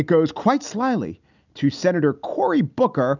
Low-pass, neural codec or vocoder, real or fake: 7.2 kHz; none; real